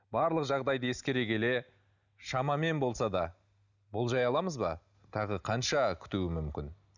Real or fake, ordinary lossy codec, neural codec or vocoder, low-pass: real; none; none; 7.2 kHz